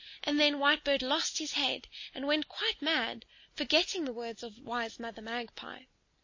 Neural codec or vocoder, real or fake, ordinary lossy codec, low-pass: none; real; MP3, 32 kbps; 7.2 kHz